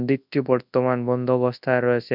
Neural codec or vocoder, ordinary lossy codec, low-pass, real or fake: codec, 24 kHz, 0.9 kbps, WavTokenizer, large speech release; none; 5.4 kHz; fake